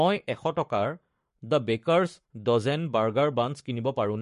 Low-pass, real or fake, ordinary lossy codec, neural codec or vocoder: 14.4 kHz; fake; MP3, 48 kbps; codec, 44.1 kHz, 7.8 kbps, Pupu-Codec